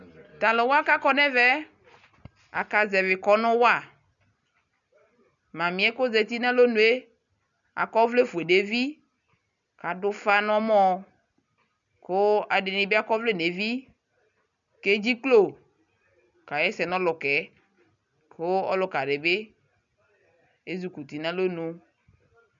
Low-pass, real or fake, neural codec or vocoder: 7.2 kHz; real; none